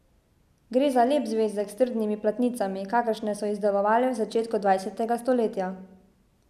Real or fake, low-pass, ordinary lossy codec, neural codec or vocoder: real; 14.4 kHz; none; none